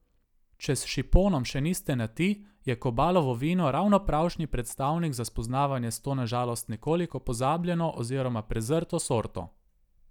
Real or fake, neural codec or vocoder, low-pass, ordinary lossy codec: real; none; 19.8 kHz; none